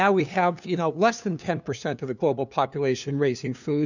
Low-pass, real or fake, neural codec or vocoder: 7.2 kHz; fake; codec, 16 kHz, 2 kbps, FunCodec, trained on LibriTTS, 25 frames a second